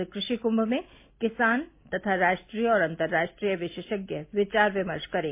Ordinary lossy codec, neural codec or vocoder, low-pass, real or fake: MP3, 24 kbps; none; 3.6 kHz; real